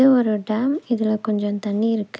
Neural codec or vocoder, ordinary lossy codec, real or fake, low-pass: none; none; real; none